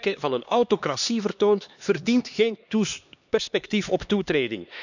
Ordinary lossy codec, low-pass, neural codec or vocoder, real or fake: none; 7.2 kHz; codec, 16 kHz, 2 kbps, X-Codec, HuBERT features, trained on LibriSpeech; fake